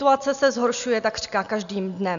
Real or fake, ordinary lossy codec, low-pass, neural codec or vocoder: real; AAC, 96 kbps; 7.2 kHz; none